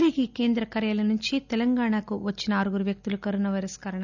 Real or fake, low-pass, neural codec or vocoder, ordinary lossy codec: real; 7.2 kHz; none; none